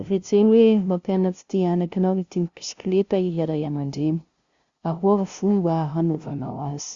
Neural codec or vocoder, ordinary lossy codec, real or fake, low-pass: codec, 16 kHz, 0.5 kbps, FunCodec, trained on LibriTTS, 25 frames a second; Opus, 64 kbps; fake; 7.2 kHz